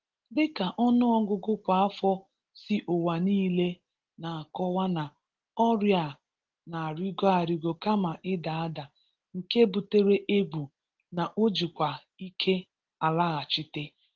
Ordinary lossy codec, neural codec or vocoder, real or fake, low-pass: Opus, 16 kbps; none; real; 7.2 kHz